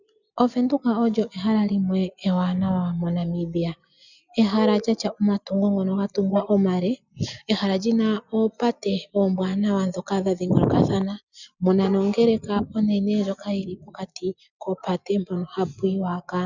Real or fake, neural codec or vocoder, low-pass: real; none; 7.2 kHz